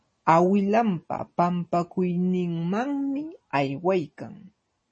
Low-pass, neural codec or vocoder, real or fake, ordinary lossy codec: 9.9 kHz; none; real; MP3, 32 kbps